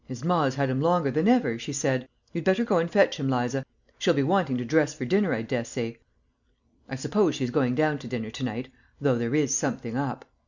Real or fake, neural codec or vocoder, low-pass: real; none; 7.2 kHz